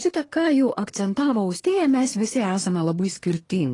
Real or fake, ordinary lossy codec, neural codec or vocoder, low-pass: fake; AAC, 32 kbps; codec, 24 kHz, 1 kbps, SNAC; 10.8 kHz